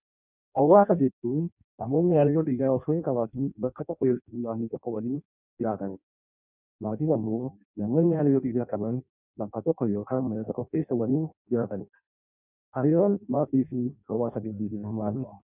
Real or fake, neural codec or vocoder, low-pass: fake; codec, 16 kHz in and 24 kHz out, 0.6 kbps, FireRedTTS-2 codec; 3.6 kHz